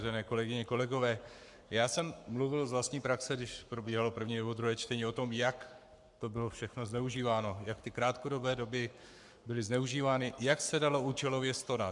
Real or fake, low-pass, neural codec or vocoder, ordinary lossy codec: fake; 10.8 kHz; codec, 44.1 kHz, 7.8 kbps, Pupu-Codec; MP3, 96 kbps